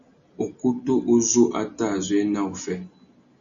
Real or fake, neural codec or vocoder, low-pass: real; none; 7.2 kHz